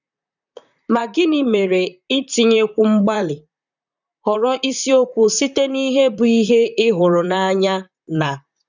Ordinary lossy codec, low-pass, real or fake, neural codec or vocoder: none; 7.2 kHz; fake; vocoder, 44.1 kHz, 128 mel bands, Pupu-Vocoder